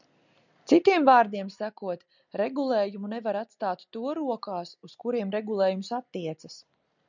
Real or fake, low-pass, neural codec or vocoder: real; 7.2 kHz; none